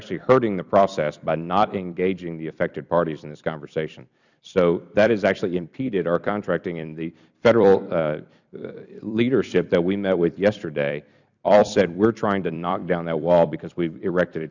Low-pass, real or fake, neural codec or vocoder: 7.2 kHz; fake; vocoder, 44.1 kHz, 128 mel bands every 256 samples, BigVGAN v2